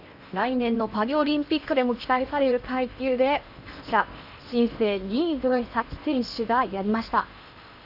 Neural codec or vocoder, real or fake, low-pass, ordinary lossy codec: codec, 16 kHz in and 24 kHz out, 0.8 kbps, FocalCodec, streaming, 65536 codes; fake; 5.4 kHz; none